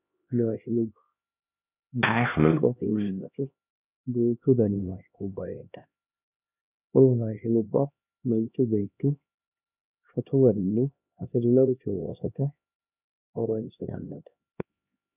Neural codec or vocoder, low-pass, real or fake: codec, 16 kHz, 1 kbps, X-Codec, HuBERT features, trained on LibriSpeech; 3.6 kHz; fake